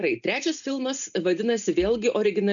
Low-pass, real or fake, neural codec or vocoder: 7.2 kHz; real; none